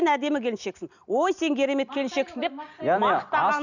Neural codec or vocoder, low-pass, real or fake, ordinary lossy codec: none; 7.2 kHz; real; none